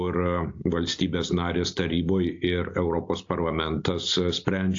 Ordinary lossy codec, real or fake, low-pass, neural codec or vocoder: AAC, 48 kbps; real; 7.2 kHz; none